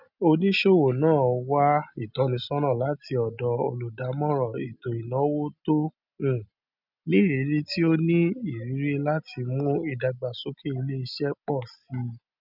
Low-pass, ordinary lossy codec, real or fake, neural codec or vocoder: 5.4 kHz; none; real; none